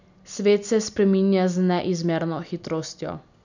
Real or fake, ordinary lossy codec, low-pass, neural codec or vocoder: real; none; 7.2 kHz; none